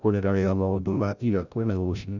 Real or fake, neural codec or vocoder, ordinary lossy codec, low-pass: fake; codec, 16 kHz, 0.5 kbps, FreqCodec, larger model; none; 7.2 kHz